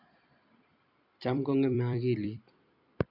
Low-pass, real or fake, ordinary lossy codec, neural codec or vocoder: 5.4 kHz; fake; AAC, 48 kbps; vocoder, 44.1 kHz, 128 mel bands every 256 samples, BigVGAN v2